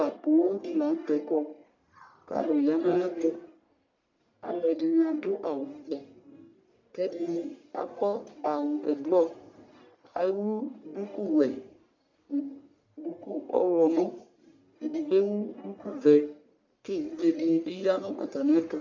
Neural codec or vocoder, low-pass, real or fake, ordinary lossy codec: codec, 44.1 kHz, 1.7 kbps, Pupu-Codec; 7.2 kHz; fake; MP3, 64 kbps